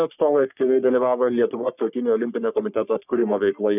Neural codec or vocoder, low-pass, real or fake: codec, 44.1 kHz, 3.4 kbps, Pupu-Codec; 3.6 kHz; fake